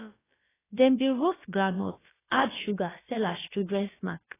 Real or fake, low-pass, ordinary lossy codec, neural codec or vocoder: fake; 3.6 kHz; AAC, 16 kbps; codec, 16 kHz, about 1 kbps, DyCAST, with the encoder's durations